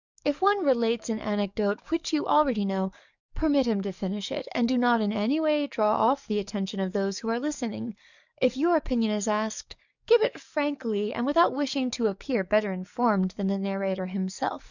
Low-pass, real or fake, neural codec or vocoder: 7.2 kHz; fake; codec, 44.1 kHz, 7.8 kbps, DAC